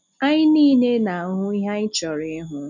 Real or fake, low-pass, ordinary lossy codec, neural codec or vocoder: real; 7.2 kHz; none; none